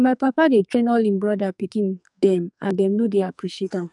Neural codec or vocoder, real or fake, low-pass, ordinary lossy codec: codec, 44.1 kHz, 2.6 kbps, SNAC; fake; 10.8 kHz; none